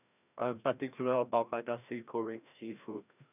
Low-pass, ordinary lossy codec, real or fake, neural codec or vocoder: 3.6 kHz; none; fake; codec, 16 kHz, 1 kbps, FreqCodec, larger model